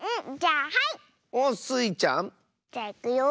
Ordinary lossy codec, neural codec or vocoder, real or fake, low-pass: none; none; real; none